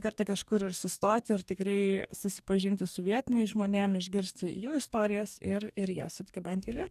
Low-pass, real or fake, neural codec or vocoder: 14.4 kHz; fake; codec, 44.1 kHz, 2.6 kbps, DAC